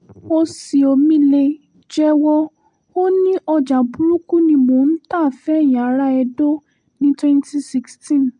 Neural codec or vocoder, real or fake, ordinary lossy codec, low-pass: none; real; MP3, 64 kbps; 9.9 kHz